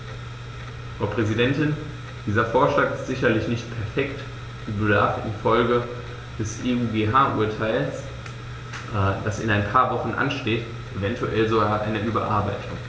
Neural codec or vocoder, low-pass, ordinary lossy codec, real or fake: none; none; none; real